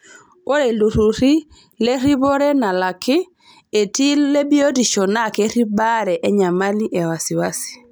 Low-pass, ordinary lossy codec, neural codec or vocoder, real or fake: none; none; none; real